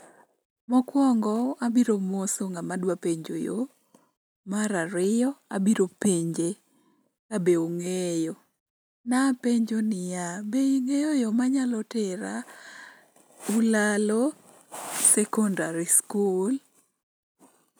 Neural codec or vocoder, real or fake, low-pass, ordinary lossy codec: none; real; none; none